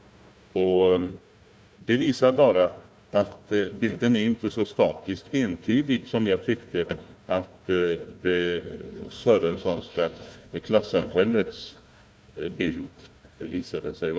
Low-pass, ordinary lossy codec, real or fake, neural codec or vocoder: none; none; fake; codec, 16 kHz, 1 kbps, FunCodec, trained on Chinese and English, 50 frames a second